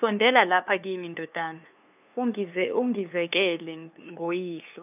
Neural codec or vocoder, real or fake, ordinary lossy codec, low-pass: codec, 16 kHz, 2 kbps, FunCodec, trained on LibriTTS, 25 frames a second; fake; none; 3.6 kHz